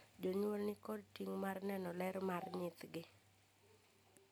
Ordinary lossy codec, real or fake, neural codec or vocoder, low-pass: none; real; none; none